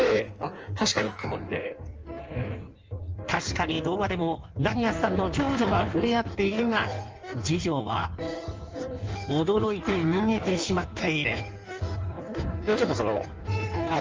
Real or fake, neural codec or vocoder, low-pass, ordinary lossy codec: fake; codec, 16 kHz in and 24 kHz out, 1.1 kbps, FireRedTTS-2 codec; 7.2 kHz; Opus, 24 kbps